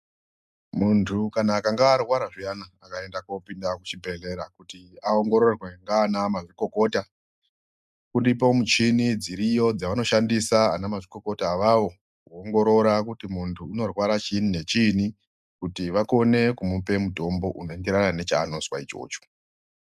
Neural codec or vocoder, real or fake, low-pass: none; real; 14.4 kHz